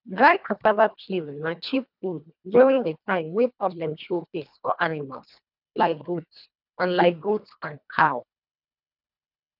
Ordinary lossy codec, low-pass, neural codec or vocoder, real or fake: none; 5.4 kHz; codec, 24 kHz, 1.5 kbps, HILCodec; fake